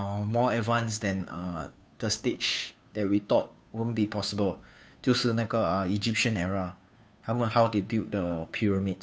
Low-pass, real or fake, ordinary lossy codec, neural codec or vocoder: none; fake; none; codec, 16 kHz, 2 kbps, FunCodec, trained on Chinese and English, 25 frames a second